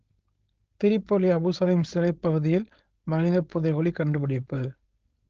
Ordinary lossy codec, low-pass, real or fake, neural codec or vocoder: Opus, 16 kbps; 7.2 kHz; fake; codec, 16 kHz, 4.8 kbps, FACodec